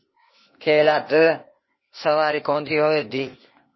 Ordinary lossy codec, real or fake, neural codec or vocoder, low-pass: MP3, 24 kbps; fake; codec, 16 kHz, 0.8 kbps, ZipCodec; 7.2 kHz